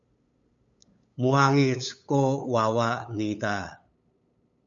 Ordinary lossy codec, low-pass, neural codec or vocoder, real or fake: MP3, 64 kbps; 7.2 kHz; codec, 16 kHz, 8 kbps, FunCodec, trained on LibriTTS, 25 frames a second; fake